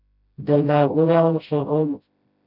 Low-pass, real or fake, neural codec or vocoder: 5.4 kHz; fake; codec, 16 kHz, 0.5 kbps, FreqCodec, smaller model